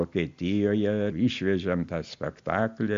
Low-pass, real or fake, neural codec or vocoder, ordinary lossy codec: 7.2 kHz; real; none; AAC, 64 kbps